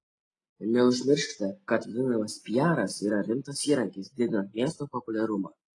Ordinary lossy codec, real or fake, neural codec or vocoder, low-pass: AAC, 32 kbps; real; none; 10.8 kHz